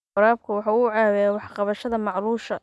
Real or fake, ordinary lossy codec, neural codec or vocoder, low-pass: real; none; none; none